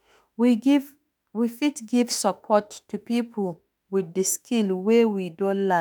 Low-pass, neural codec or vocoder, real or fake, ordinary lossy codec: none; autoencoder, 48 kHz, 32 numbers a frame, DAC-VAE, trained on Japanese speech; fake; none